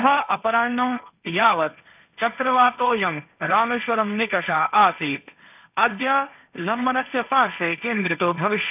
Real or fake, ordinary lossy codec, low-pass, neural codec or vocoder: fake; none; 3.6 kHz; codec, 16 kHz, 1.1 kbps, Voila-Tokenizer